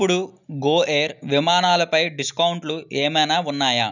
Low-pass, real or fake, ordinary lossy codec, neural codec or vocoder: 7.2 kHz; real; none; none